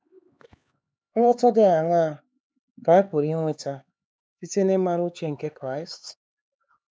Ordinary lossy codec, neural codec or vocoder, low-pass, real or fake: none; codec, 16 kHz, 4 kbps, X-Codec, HuBERT features, trained on LibriSpeech; none; fake